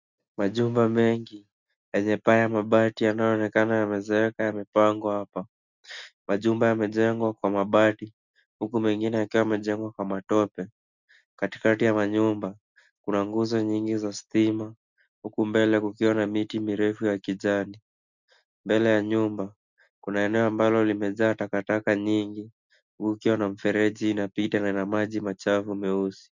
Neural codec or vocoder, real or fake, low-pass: none; real; 7.2 kHz